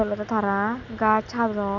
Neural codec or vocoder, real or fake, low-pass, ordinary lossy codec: none; real; 7.2 kHz; none